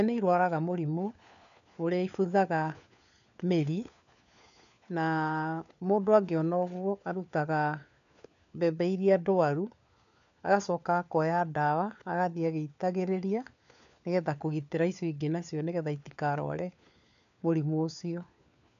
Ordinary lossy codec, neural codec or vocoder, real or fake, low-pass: none; codec, 16 kHz, 4 kbps, FunCodec, trained on LibriTTS, 50 frames a second; fake; 7.2 kHz